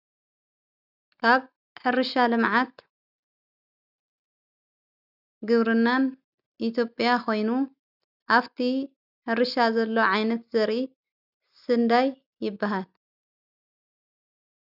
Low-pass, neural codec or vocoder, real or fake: 5.4 kHz; none; real